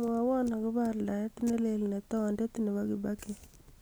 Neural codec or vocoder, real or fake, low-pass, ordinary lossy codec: none; real; none; none